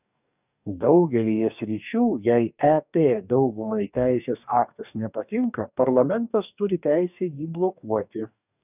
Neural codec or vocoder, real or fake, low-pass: codec, 44.1 kHz, 2.6 kbps, DAC; fake; 3.6 kHz